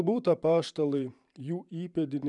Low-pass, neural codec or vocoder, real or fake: 10.8 kHz; vocoder, 44.1 kHz, 128 mel bands every 512 samples, BigVGAN v2; fake